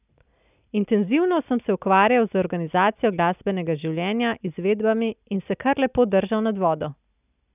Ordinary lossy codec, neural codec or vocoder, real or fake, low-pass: none; none; real; 3.6 kHz